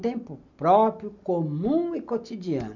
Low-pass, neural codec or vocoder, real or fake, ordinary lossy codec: 7.2 kHz; none; real; none